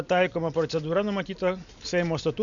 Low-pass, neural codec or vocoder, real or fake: 7.2 kHz; none; real